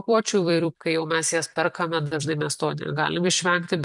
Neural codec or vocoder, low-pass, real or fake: vocoder, 44.1 kHz, 128 mel bands every 256 samples, BigVGAN v2; 10.8 kHz; fake